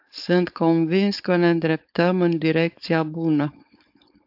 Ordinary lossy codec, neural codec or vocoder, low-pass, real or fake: AAC, 48 kbps; codec, 16 kHz, 4.8 kbps, FACodec; 5.4 kHz; fake